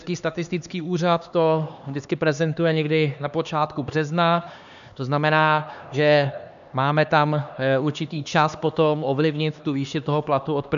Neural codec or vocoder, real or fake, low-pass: codec, 16 kHz, 2 kbps, X-Codec, HuBERT features, trained on LibriSpeech; fake; 7.2 kHz